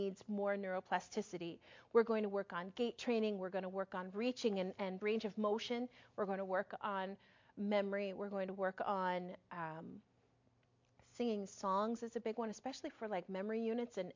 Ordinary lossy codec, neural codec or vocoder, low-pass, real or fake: MP3, 48 kbps; none; 7.2 kHz; real